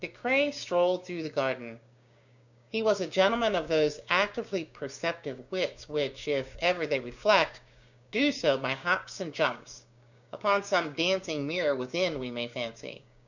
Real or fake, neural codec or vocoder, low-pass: fake; codec, 16 kHz, 6 kbps, DAC; 7.2 kHz